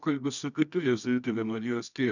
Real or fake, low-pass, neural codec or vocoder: fake; 7.2 kHz; codec, 24 kHz, 0.9 kbps, WavTokenizer, medium music audio release